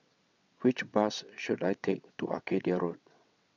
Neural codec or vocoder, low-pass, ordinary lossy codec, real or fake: vocoder, 22.05 kHz, 80 mel bands, WaveNeXt; 7.2 kHz; none; fake